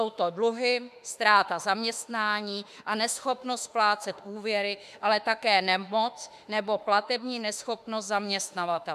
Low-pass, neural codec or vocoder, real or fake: 14.4 kHz; autoencoder, 48 kHz, 32 numbers a frame, DAC-VAE, trained on Japanese speech; fake